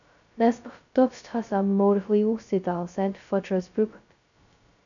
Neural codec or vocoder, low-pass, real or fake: codec, 16 kHz, 0.2 kbps, FocalCodec; 7.2 kHz; fake